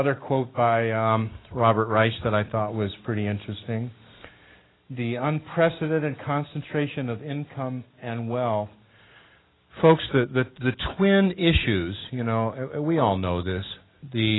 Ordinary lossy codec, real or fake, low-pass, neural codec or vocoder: AAC, 16 kbps; real; 7.2 kHz; none